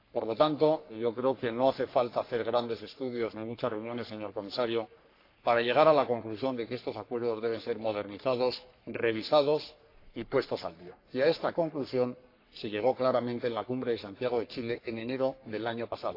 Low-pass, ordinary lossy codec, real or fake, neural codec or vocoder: 5.4 kHz; AAC, 32 kbps; fake; codec, 44.1 kHz, 3.4 kbps, Pupu-Codec